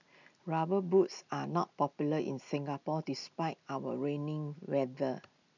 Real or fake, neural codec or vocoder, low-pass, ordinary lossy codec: real; none; 7.2 kHz; none